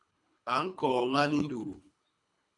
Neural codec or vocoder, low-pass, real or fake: codec, 24 kHz, 3 kbps, HILCodec; 10.8 kHz; fake